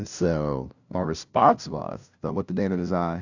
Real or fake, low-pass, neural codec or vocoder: fake; 7.2 kHz; codec, 16 kHz, 0.5 kbps, FunCodec, trained on LibriTTS, 25 frames a second